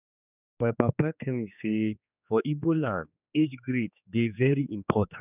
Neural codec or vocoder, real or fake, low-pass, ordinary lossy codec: codec, 16 kHz, 2 kbps, X-Codec, HuBERT features, trained on general audio; fake; 3.6 kHz; none